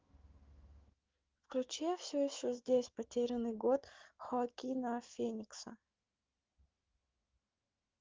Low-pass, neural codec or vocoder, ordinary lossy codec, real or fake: 7.2 kHz; autoencoder, 48 kHz, 128 numbers a frame, DAC-VAE, trained on Japanese speech; Opus, 32 kbps; fake